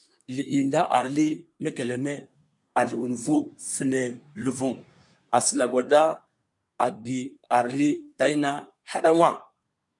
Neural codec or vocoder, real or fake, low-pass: codec, 24 kHz, 1 kbps, SNAC; fake; 10.8 kHz